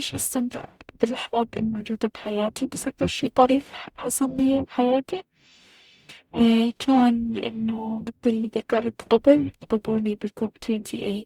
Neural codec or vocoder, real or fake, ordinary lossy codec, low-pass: codec, 44.1 kHz, 0.9 kbps, DAC; fake; Opus, 64 kbps; 19.8 kHz